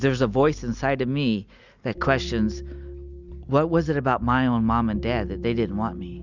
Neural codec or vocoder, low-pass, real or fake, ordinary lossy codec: none; 7.2 kHz; real; Opus, 64 kbps